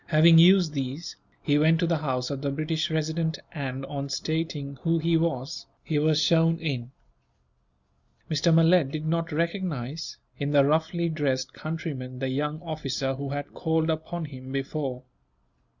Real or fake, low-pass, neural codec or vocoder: real; 7.2 kHz; none